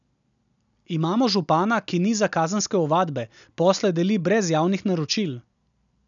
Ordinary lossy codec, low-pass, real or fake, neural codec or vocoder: none; 7.2 kHz; real; none